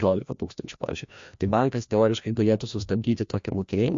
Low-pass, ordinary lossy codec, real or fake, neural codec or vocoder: 7.2 kHz; MP3, 48 kbps; fake; codec, 16 kHz, 1 kbps, FreqCodec, larger model